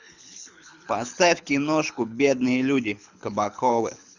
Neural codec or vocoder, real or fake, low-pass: codec, 24 kHz, 6 kbps, HILCodec; fake; 7.2 kHz